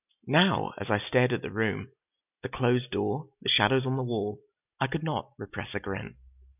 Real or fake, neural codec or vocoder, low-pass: real; none; 3.6 kHz